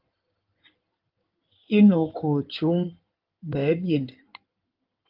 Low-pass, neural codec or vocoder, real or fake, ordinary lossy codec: 5.4 kHz; codec, 16 kHz in and 24 kHz out, 2.2 kbps, FireRedTTS-2 codec; fake; Opus, 32 kbps